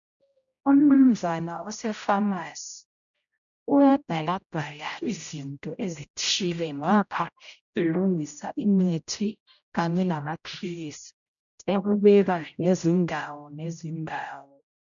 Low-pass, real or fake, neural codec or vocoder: 7.2 kHz; fake; codec, 16 kHz, 0.5 kbps, X-Codec, HuBERT features, trained on general audio